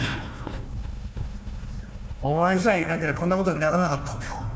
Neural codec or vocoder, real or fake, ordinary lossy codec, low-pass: codec, 16 kHz, 1 kbps, FunCodec, trained on Chinese and English, 50 frames a second; fake; none; none